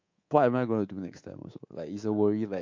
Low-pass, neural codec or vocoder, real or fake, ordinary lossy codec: 7.2 kHz; codec, 24 kHz, 3.1 kbps, DualCodec; fake; MP3, 48 kbps